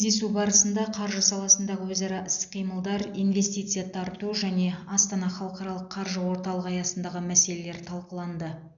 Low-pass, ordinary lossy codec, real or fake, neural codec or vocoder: 7.2 kHz; none; real; none